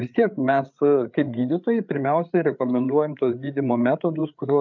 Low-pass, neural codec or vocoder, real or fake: 7.2 kHz; codec, 16 kHz, 8 kbps, FreqCodec, larger model; fake